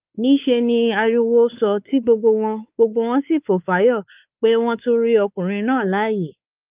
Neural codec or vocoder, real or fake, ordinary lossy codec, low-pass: codec, 16 kHz, 4 kbps, X-Codec, WavLM features, trained on Multilingual LibriSpeech; fake; Opus, 24 kbps; 3.6 kHz